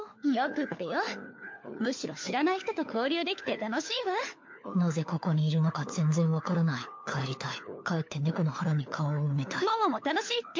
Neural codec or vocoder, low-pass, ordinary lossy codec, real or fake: codec, 16 kHz, 4 kbps, FunCodec, trained on LibriTTS, 50 frames a second; 7.2 kHz; MP3, 48 kbps; fake